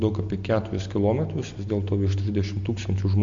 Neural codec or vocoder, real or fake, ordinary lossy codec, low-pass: none; real; MP3, 48 kbps; 7.2 kHz